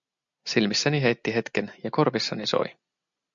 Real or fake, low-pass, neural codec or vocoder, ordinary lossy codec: real; 7.2 kHz; none; MP3, 64 kbps